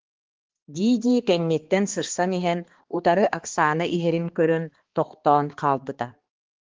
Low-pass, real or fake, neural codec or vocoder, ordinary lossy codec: 7.2 kHz; fake; codec, 16 kHz, 2 kbps, X-Codec, HuBERT features, trained on balanced general audio; Opus, 16 kbps